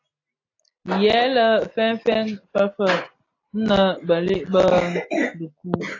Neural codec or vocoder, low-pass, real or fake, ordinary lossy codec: none; 7.2 kHz; real; AAC, 32 kbps